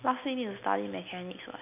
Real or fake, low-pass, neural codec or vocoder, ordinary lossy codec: real; 3.6 kHz; none; none